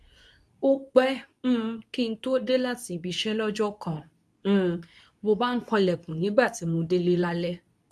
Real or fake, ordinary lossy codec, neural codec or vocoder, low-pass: fake; none; codec, 24 kHz, 0.9 kbps, WavTokenizer, medium speech release version 2; none